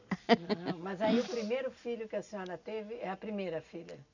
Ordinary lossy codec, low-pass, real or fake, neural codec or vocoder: none; 7.2 kHz; real; none